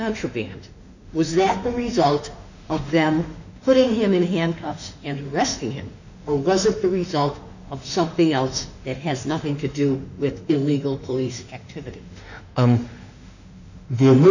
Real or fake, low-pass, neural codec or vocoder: fake; 7.2 kHz; autoencoder, 48 kHz, 32 numbers a frame, DAC-VAE, trained on Japanese speech